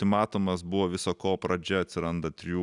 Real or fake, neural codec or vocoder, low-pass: real; none; 10.8 kHz